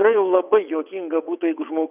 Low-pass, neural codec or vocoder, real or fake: 3.6 kHz; vocoder, 22.05 kHz, 80 mel bands, WaveNeXt; fake